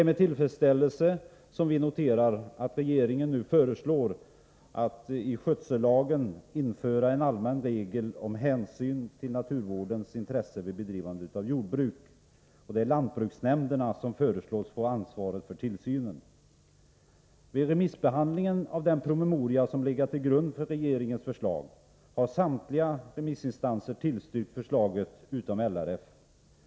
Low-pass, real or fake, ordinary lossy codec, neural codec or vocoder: none; real; none; none